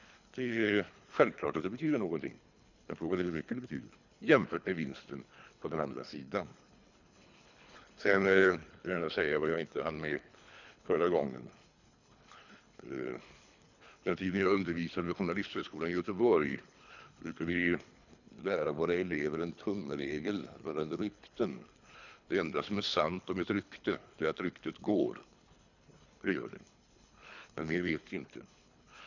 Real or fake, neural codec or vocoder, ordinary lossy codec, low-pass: fake; codec, 24 kHz, 3 kbps, HILCodec; none; 7.2 kHz